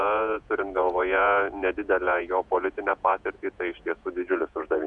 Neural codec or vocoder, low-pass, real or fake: vocoder, 48 kHz, 128 mel bands, Vocos; 10.8 kHz; fake